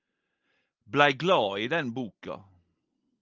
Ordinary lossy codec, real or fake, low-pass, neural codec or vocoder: Opus, 24 kbps; real; 7.2 kHz; none